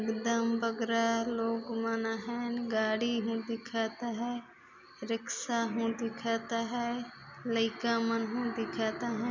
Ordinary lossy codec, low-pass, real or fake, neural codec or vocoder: none; 7.2 kHz; real; none